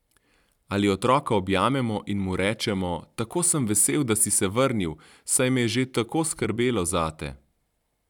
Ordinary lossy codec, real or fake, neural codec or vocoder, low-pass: none; fake; vocoder, 44.1 kHz, 128 mel bands every 256 samples, BigVGAN v2; 19.8 kHz